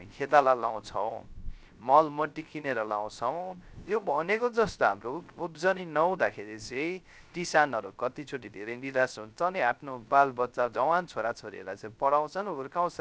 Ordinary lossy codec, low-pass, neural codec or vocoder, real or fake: none; none; codec, 16 kHz, 0.3 kbps, FocalCodec; fake